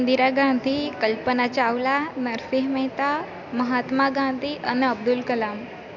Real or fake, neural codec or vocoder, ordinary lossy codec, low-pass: real; none; none; 7.2 kHz